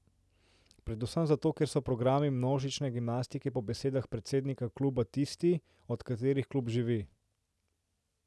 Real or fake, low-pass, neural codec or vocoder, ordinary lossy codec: real; none; none; none